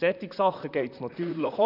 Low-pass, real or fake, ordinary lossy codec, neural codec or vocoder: 5.4 kHz; fake; none; vocoder, 44.1 kHz, 128 mel bands, Pupu-Vocoder